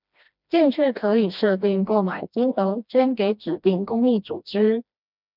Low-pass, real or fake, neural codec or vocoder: 5.4 kHz; fake; codec, 16 kHz, 1 kbps, FreqCodec, smaller model